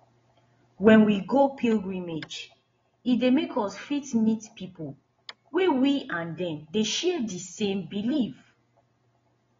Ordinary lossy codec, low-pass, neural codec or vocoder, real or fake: AAC, 24 kbps; 7.2 kHz; none; real